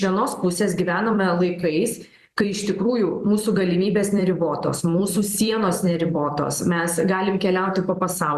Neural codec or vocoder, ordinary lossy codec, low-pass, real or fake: vocoder, 44.1 kHz, 128 mel bands every 256 samples, BigVGAN v2; Opus, 64 kbps; 14.4 kHz; fake